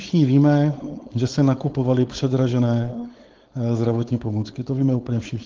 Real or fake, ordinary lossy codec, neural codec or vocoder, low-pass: fake; Opus, 16 kbps; codec, 16 kHz, 4.8 kbps, FACodec; 7.2 kHz